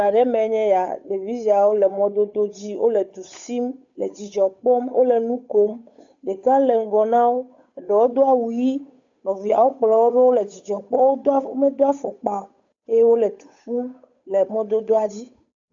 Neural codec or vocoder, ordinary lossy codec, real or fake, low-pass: codec, 16 kHz, 8 kbps, FunCodec, trained on Chinese and English, 25 frames a second; AAC, 64 kbps; fake; 7.2 kHz